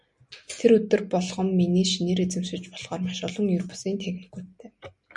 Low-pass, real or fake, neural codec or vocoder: 10.8 kHz; real; none